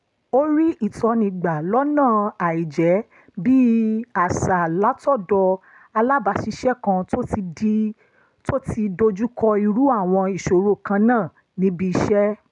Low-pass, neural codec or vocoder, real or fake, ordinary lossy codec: 10.8 kHz; none; real; none